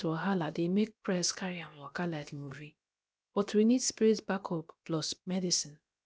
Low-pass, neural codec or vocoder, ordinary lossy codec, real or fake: none; codec, 16 kHz, about 1 kbps, DyCAST, with the encoder's durations; none; fake